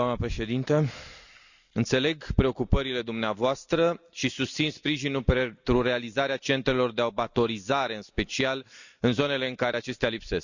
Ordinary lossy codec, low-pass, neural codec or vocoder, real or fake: none; 7.2 kHz; none; real